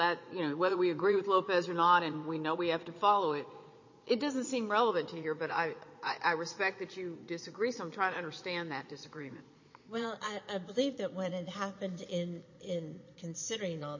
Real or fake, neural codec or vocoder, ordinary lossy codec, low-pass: fake; vocoder, 22.05 kHz, 80 mel bands, Vocos; MP3, 32 kbps; 7.2 kHz